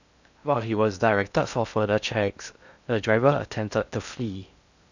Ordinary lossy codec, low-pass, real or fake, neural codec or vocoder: none; 7.2 kHz; fake; codec, 16 kHz in and 24 kHz out, 0.6 kbps, FocalCodec, streaming, 2048 codes